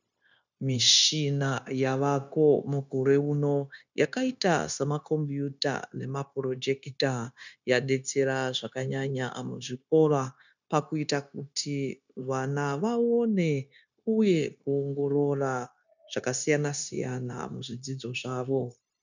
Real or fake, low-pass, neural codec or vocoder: fake; 7.2 kHz; codec, 16 kHz, 0.9 kbps, LongCat-Audio-Codec